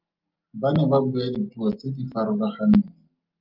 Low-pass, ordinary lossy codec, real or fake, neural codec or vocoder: 5.4 kHz; Opus, 24 kbps; real; none